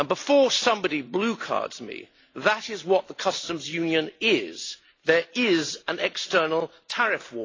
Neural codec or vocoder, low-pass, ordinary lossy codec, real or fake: none; 7.2 kHz; AAC, 32 kbps; real